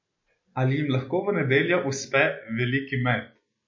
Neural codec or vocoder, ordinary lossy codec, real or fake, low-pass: none; MP3, 32 kbps; real; 7.2 kHz